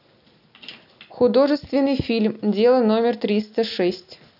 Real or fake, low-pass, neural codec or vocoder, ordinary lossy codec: real; 5.4 kHz; none; none